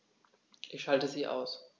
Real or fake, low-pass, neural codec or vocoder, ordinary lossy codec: real; none; none; none